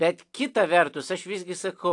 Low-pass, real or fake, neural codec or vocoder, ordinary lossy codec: 10.8 kHz; real; none; AAC, 64 kbps